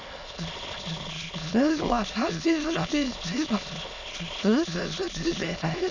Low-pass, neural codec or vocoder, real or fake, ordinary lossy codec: 7.2 kHz; autoencoder, 22.05 kHz, a latent of 192 numbers a frame, VITS, trained on many speakers; fake; none